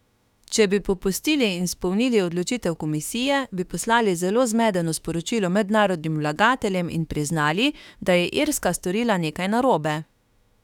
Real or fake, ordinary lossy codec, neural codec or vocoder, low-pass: fake; none; autoencoder, 48 kHz, 32 numbers a frame, DAC-VAE, trained on Japanese speech; 19.8 kHz